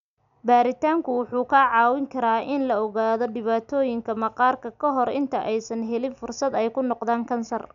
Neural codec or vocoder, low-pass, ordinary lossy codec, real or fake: none; 7.2 kHz; none; real